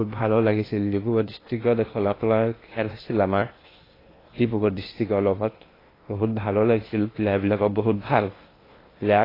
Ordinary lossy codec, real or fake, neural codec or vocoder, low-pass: AAC, 24 kbps; fake; codec, 16 kHz in and 24 kHz out, 0.8 kbps, FocalCodec, streaming, 65536 codes; 5.4 kHz